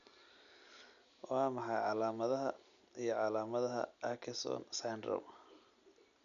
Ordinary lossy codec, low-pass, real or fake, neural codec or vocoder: none; 7.2 kHz; real; none